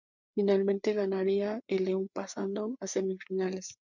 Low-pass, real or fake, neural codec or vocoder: 7.2 kHz; fake; codec, 16 kHz, 4 kbps, FreqCodec, larger model